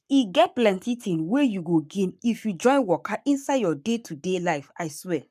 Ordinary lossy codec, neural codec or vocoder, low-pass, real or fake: none; codec, 44.1 kHz, 7.8 kbps, Pupu-Codec; 14.4 kHz; fake